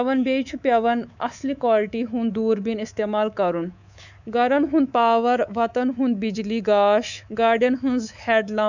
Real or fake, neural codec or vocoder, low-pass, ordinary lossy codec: fake; codec, 24 kHz, 3.1 kbps, DualCodec; 7.2 kHz; none